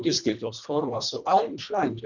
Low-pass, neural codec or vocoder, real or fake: 7.2 kHz; codec, 24 kHz, 1.5 kbps, HILCodec; fake